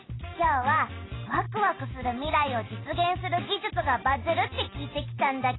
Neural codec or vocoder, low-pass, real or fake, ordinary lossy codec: none; 7.2 kHz; real; AAC, 16 kbps